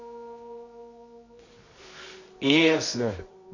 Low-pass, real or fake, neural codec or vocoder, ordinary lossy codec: 7.2 kHz; fake; codec, 24 kHz, 0.9 kbps, WavTokenizer, medium music audio release; none